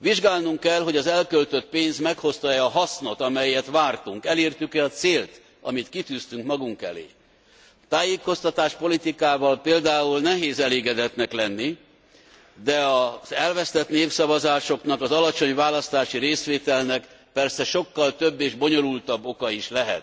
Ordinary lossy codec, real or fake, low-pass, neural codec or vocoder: none; real; none; none